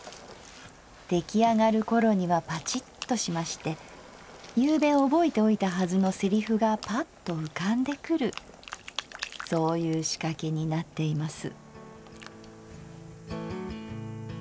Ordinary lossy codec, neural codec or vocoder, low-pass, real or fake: none; none; none; real